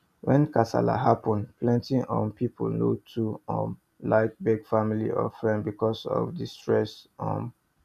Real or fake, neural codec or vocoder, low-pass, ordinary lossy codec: real; none; 14.4 kHz; none